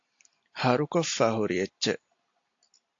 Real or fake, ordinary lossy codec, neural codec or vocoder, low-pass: real; AAC, 48 kbps; none; 7.2 kHz